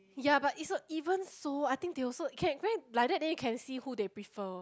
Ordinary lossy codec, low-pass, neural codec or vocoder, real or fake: none; none; none; real